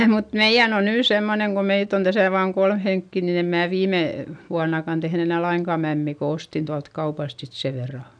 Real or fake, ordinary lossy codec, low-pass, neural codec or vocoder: real; none; 9.9 kHz; none